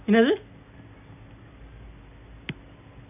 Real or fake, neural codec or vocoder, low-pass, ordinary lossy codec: real; none; 3.6 kHz; none